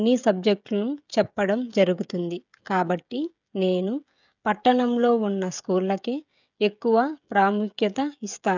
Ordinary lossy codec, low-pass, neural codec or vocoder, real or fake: none; 7.2 kHz; codec, 16 kHz, 16 kbps, FreqCodec, smaller model; fake